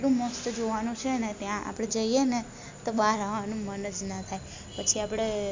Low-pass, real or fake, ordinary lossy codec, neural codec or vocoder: 7.2 kHz; real; MP3, 64 kbps; none